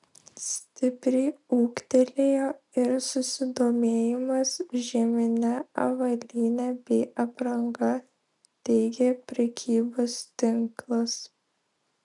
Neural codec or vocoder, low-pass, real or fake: none; 10.8 kHz; real